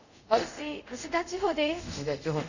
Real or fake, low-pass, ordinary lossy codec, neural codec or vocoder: fake; 7.2 kHz; none; codec, 24 kHz, 0.5 kbps, DualCodec